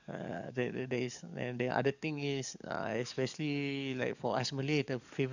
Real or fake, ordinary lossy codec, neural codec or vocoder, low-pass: fake; none; codec, 44.1 kHz, 7.8 kbps, DAC; 7.2 kHz